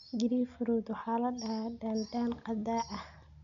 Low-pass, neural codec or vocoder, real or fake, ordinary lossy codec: 7.2 kHz; none; real; none